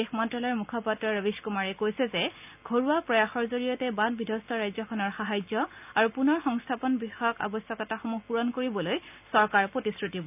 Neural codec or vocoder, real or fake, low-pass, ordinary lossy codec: none; real; 3.6 kHz; none